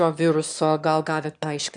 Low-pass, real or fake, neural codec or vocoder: 9.9 kHz; fake; autoencoder, 22.05 kHz, a latent of 192 numbers a frame, VITS, trained on one speaker